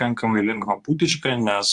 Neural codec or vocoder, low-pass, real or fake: codec, 24 kHz, 0.9 kbps, WavTokenizer, medium speech release version 2; 10.8 kHz; fake